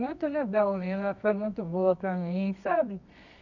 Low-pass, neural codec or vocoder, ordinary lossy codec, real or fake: 7.2 kHz; codec, 24 kHz, 0.9 kbps, WavTokenizer, medium music audio release; none; fake